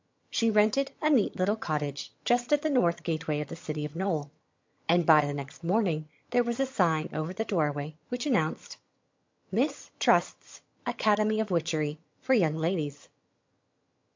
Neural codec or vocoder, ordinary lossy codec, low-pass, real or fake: vocoder, 22.05 kHz, 80 mel bands, HiFi-GAN; MP3, 48 kbps; 7.2 kHz; fake